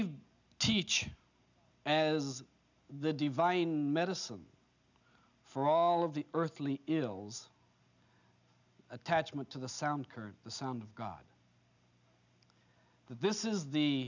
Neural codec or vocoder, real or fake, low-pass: none; real; 7.2 kHz